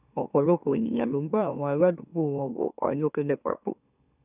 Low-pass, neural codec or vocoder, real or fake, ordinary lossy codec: 3.6 kHz; autoencoder, 44.1 kHz, a latent of 192 numbers a frame, MeloTTS; fake; none